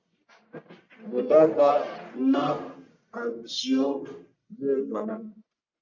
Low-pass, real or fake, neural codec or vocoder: 7.2 kHz; fake; codec, 44.1 kHz, 1.7 kbps, Pupu-Codec